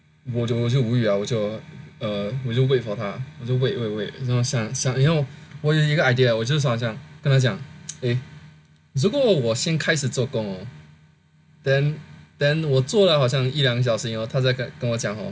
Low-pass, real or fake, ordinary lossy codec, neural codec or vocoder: none; real; none; none